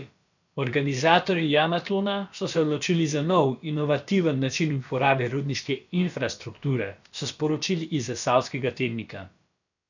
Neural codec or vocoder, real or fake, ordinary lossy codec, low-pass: codec, 16 kHz, about 1 kbps, DyCAST, with the encoder's durations; fake; none; 7.2 kHz